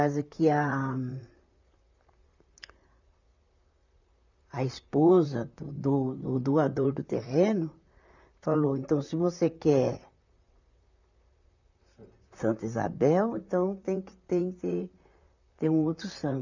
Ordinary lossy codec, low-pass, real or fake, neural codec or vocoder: none; 7.2 kHz; fake; vocoder, 44.1 kHz, 128 mel bands, Pupu-Vocoder